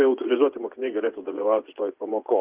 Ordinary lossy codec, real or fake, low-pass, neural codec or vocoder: Opus, 32 kbps; fake; 3.6 kHz; vocoder, 24 kHz, 100 mel bands, Vocos